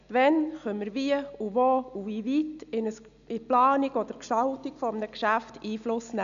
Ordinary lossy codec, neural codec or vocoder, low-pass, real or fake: none; none; 7.2 kHz; real